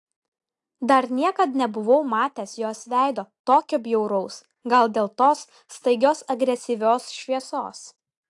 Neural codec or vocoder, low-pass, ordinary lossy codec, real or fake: none; 10.8 kHz; AAC, 64 kbps; real